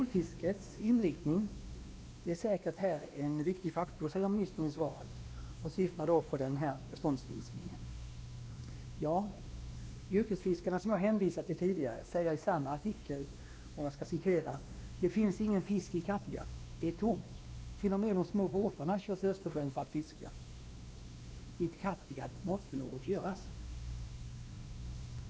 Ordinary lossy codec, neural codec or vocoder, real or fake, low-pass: none; codec, 16 kHz, 2 kbps, X-Codec, WavLM features, trained on Multilingual LibriSpeech; fake; none